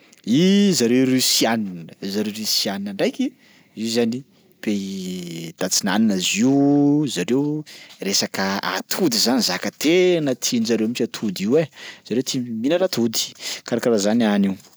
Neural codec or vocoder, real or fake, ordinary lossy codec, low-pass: none; real; none; none